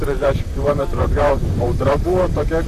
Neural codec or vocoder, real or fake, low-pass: vocoder, 44.1 kHz, 128 mel bands, Pupu-Vocoder; fake; 14.4 kHz